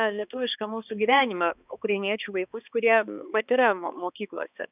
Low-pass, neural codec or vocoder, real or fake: 3.6 kHz; codec, 16 kHz, 2 kbps, X-Codec, HuBERT features, trained on balanced general audio; fake